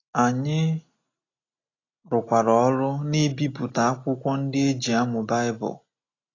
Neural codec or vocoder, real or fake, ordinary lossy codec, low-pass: none; real; AAC, 32 kbps; 7.2 kHz